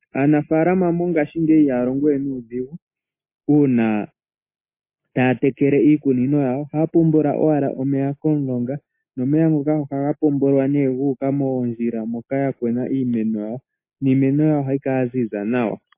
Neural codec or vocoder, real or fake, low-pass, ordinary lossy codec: none; real; 3.6 kHz; MP3, 24 kbps